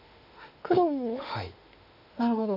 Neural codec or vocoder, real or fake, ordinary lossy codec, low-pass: autoencoder, 48 kHz, 32 numbers a frame, DAC-VAE, trained on Japanese speech; fake; none; 5.4 kHz